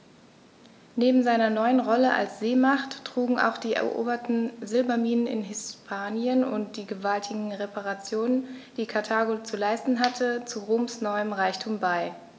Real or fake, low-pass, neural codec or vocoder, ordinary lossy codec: real; none; none; none